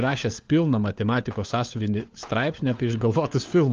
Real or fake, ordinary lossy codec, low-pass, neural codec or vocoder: fake; Opus, 32 kbps; 7.2 kHz; codec, 16 kHz, 4 kbps, FunCodec, trained on LibriTTS, 50 frames a second